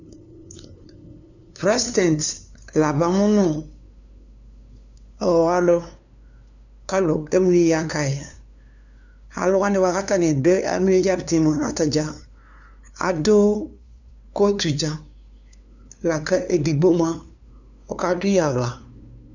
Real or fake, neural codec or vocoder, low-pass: fake; codec, 16 kHz, 2 kbps, FunCodec, trained on LibriTTS, 25 frames a second; 7.2 kHz